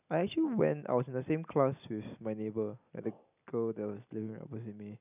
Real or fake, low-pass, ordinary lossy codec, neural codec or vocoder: real; 3.6 kHz; none; none